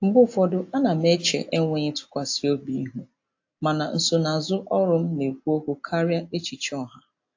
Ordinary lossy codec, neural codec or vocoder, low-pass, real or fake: AAC, 48 kbps; none; 7.2 kHz; real